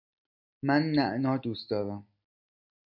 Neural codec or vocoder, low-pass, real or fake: none; 5.4 kHz; real